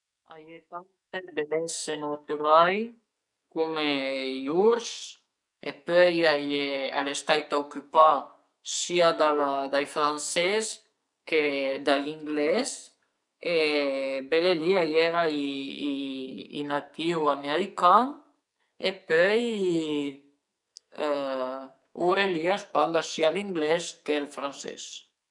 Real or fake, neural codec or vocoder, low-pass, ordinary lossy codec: fake; codec, 44.1 kHz, 2.6 kbps, SNAC; 10.8 kHz; none